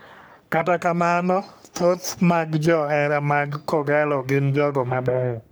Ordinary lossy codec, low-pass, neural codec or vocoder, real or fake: none; none; codec, 44.1 kHz, 3.4 kbps, Pupu-Codec; fake